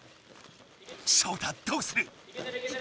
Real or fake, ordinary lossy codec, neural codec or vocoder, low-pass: real; none; none; none